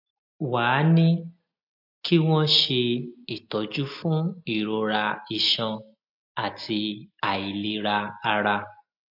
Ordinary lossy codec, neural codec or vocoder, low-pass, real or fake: MP3, 48 kbps; none; 5.4 kHz; real